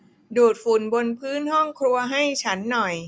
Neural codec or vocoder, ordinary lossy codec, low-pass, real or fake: none; none; none; real